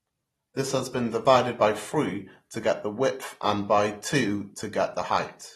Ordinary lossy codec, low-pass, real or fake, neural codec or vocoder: AAC, 32 kbps; 19.8 kHz; fake; vocoder, 44.1 kHz, 128 mel bands every 512 samples, BigVGAN v2